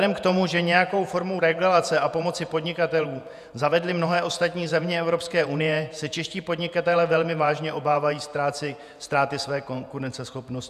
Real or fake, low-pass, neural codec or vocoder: fake; 14.4 kHz; vocoder, 48 kHz, 128 mel bands, Vocos